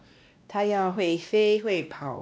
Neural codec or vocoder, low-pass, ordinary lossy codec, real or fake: codec, 16 kHz, 1 kbps, X-Codec, WavLM features, trained on Multilingual LibriSpeech; none; none; fake